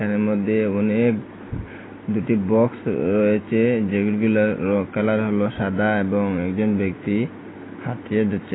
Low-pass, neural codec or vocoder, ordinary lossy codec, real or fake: 7.2 kHz; none; AAC, 16 kbps; real